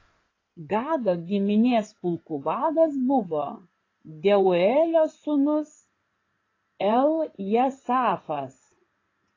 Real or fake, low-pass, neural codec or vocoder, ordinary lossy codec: fake; 7.2 kHz; codec, 16 kHz in and 24 kHz out, 2.2 kbps, FireRedTTS-2 codec; AAC, 32 kbps